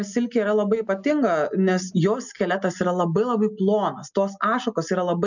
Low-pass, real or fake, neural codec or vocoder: 7.2 kHz; real; none